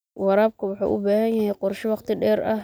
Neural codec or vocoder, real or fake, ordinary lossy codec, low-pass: none; real; none; none